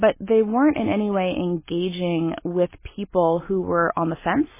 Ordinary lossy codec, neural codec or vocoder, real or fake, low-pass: MP3, 16 kbps; none; real; 3.6 kHz